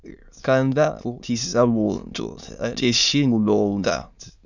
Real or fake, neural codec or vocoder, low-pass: fake; autoencoder, 22.05 kHz, a latent of 192 numbers a frame, VITS, trained on many speakers; 7.2 kHz